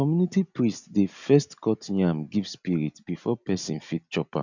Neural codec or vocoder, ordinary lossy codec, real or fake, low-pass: none; none; real; 7.2 kHz